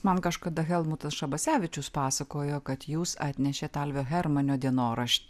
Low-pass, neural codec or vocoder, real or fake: 14.4 kHz; none; real